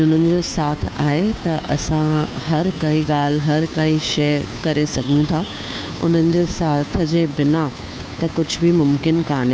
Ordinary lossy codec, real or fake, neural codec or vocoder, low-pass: none; fake; codec, 16 kHz, 2 kbps, FunCodec, trained on Chinese and English, 25 frames a second; none